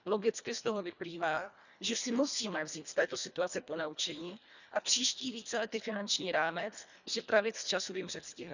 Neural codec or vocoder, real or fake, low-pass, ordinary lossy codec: codec, 24 kHz, 1.5 kbps, HILCodec; fake; 7.2 kHz; none